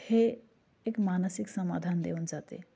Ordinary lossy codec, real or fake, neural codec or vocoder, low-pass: none; real; none; none